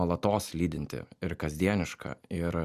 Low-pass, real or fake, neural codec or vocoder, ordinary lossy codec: 14.4 kHz; real; none; Opus, 64 kbps